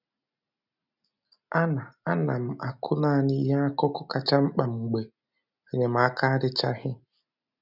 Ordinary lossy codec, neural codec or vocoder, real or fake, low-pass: none; none; real; 5.4 kHz